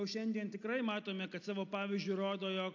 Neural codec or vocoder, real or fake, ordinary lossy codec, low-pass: none; real; AAC, 48 kbps; 7.2 kHz